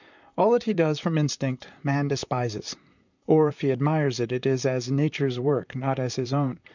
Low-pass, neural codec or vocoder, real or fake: 7.2 kHz; vocoder, 44.1 kHz, 128 mel bands, Pupu-Vocoder; fake